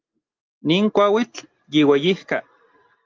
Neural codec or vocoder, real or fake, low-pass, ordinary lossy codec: none; real; 7.2 kHz; Opus, 24 kbps